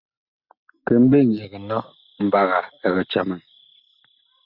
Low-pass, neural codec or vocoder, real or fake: 5.4 kHz; none; real